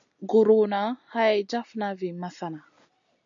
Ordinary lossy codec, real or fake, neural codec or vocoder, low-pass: AAC, 64 kbps; real; none; 7.2 kHz